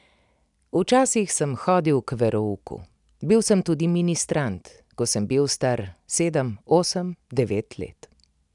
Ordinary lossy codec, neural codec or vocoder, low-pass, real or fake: none; none; 10.8 kHz; real